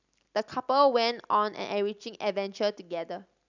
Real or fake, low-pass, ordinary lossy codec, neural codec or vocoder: real; 7.2 kHz; none; none